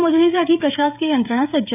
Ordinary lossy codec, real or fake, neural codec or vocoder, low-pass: none; fake; codec, 16 kHz, 16 kbps, FreqCodec, smaller model; 3.6 kHz